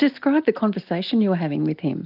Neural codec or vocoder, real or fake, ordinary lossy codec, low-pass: none; real; Opus, 24 kbps; 5.4 kHz